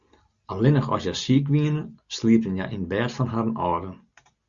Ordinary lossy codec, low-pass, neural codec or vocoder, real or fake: Opus, 64 kbps; 7.2 kHz; none; real